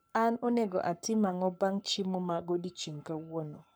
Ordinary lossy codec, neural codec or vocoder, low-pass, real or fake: none; codec, 44.1 kHz, 7.8 kbps, Pupu-Codec; none; fake